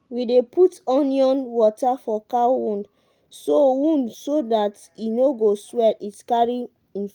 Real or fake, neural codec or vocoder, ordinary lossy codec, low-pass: real; none; Opus, 32 kbps; 19.8 kHz